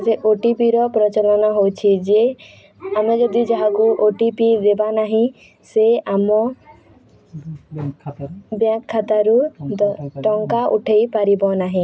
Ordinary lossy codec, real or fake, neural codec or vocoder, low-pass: none; real; none; none